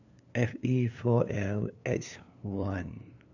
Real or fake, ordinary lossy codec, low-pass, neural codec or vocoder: fake; none; 7.2 kHz; codec, 16 kHz, 2 kbps, FunCodec, trained on LibriTTS, 25 frames a second